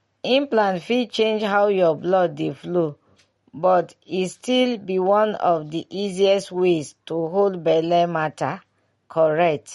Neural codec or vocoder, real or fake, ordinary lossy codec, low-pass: none; real; MP3, 48 kbps; 19.8 kHz